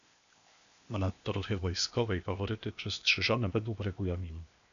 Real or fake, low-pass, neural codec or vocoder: fake; 7.2 kHz; codec, 16 kHz, 0.8 kbps, ZipCodec